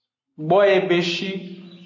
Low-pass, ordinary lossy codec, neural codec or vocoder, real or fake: 7.2 kHz; AAC, 48 kbps; none; real